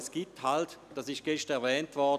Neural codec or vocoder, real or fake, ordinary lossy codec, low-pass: none; real; none; 14.4 kHz